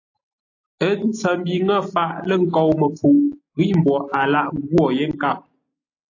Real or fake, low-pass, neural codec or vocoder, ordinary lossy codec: real; 7.2 kHz; none; AAC, 48 kbps